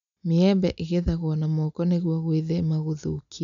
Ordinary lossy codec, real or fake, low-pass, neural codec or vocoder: none; real; 7.2 kHz; none